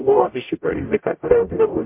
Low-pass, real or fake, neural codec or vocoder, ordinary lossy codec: 3.6 kHz; fake; codec, 44.1 kHz, 0.9 kbps, DAC; MP3, 32 kbps